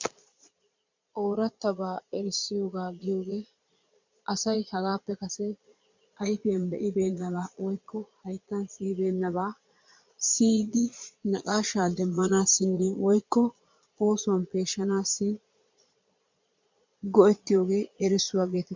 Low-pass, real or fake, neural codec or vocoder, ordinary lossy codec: 7.2 kHz; fake; vocoder, 22.05 kHz, 80 mel bands, Vocos; MP3, 64 kbps